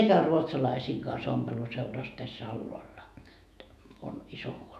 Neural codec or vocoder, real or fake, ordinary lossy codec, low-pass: autoencoder, 48 kHz, 128 numbers a frame, DAC-VAE, trained on Japanese speech; fake; none; 14.4 kHz